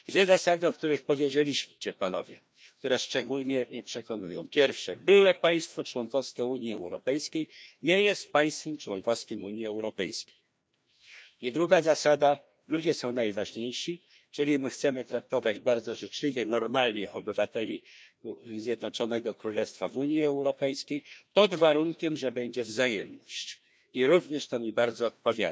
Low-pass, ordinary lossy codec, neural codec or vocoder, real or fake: none; none; codec, 16 kHz, 1 kbps, FreqCodec, larger model; fake